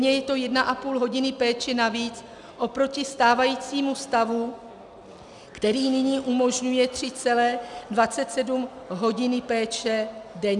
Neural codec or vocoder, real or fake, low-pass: none; real; 10.8 kHz